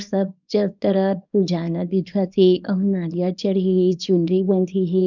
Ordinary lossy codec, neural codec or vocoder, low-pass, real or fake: none; codec, 24 kHz, 0.9 kbps, WavTokenizer, small release; 7.2 kHz; fake